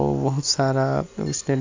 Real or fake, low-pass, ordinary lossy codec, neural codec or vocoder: real; 7.2 kHz; AAC, 48 kbps; none